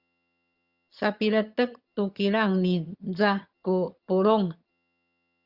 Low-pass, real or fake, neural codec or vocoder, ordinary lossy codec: 5.4 kHz; fake; vocoder, 22.05 kHz, 80 mel bands, HiFi-GAN; Opus, 64 kbps